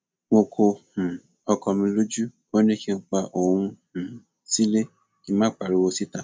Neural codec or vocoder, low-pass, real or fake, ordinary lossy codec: none; none; real; none